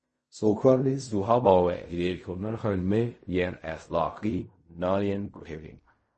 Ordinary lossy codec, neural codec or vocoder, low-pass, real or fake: MP3, 32 kbps; codec, 16 kHz in and 24 kHz out, 0.4 kbps, LongCat-Audio-Codec, fine tuned four codebook decoder; 10.8 kHz; fake